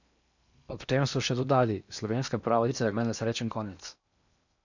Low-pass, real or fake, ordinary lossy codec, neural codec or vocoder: 7.2 kHz; fake; none; codec, 16 kHz in and 24 kHz out, 0.8 kbps, FocalCodec, streaming, 65536 codes